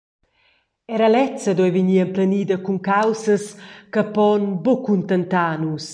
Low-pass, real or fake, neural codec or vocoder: 9.9 kHz; real; none